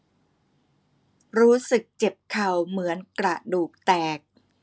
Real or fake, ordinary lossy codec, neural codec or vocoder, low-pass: real; none; none; none